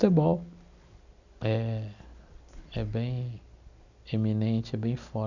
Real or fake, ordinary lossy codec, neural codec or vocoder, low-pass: real; none; none; 7.2 kHz